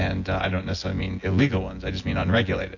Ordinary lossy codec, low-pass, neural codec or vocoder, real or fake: AAC, 48 kbps; 7.2 kHz; vocoder, 24 kHz, 100 mel bands, Vocos; fake